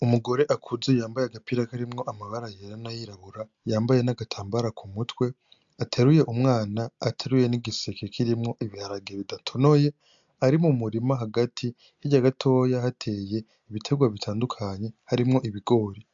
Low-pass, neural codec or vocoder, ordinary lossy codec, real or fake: 7.2 kHz; none; MP3, 64 kbps; real